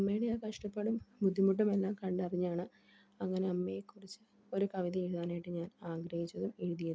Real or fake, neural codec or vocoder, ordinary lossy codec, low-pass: real; none; none; none